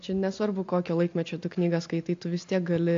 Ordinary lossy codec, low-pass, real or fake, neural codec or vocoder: AAC, 48 kbps; 7.2 kHz; real; none